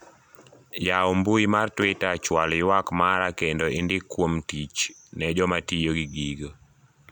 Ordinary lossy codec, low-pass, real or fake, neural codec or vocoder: none; 19.8 kHz; real; none